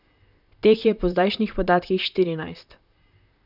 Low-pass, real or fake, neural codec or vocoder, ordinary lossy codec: 5.4 kHz; fake; vocoder, 44.1 kHz, 128 mel bands, Pupu-Vocoder; none